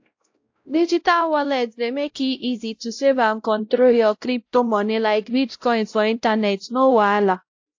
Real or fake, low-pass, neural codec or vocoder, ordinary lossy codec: fake; 7.2 kHz; codec, 16 kHz, 0.5 kbps, X-Codec, WavLM features, trained on Multilingual LibriSpeech; AAC, 48 kbps